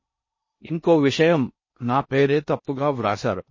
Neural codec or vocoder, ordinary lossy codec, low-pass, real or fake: codec, 16 kHz in and 24 kHz out, 0.6 kbps, FocalCodec, streaming, 4096 codes; MP3, 32 kbps; 7.2 kHz; fake